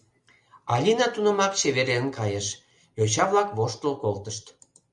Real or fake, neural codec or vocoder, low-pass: real; none; 10.8 kHz